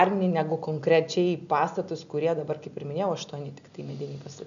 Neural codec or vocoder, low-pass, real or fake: none; 7.2 kHz; real